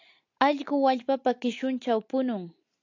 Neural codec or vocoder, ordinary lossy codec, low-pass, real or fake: none; AAC, 48 kbps; 7.2 kHz; real